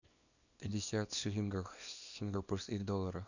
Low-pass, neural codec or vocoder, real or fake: 7.2 kHz; codec, 24 kHz, 0.9 kbps, WavTokenizer, small release; fake